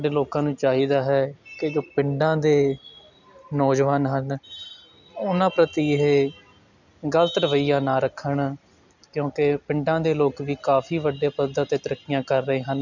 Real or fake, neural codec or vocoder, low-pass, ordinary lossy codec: real; none; 7.2 kHz; none